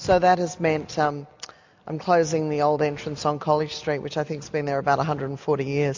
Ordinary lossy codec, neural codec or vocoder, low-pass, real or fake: MP3, 48 kbps; none; 7.2 kHz; real